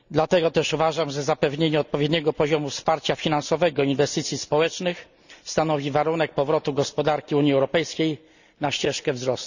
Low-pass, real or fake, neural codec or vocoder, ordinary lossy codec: 7.2 kHz; real; none; none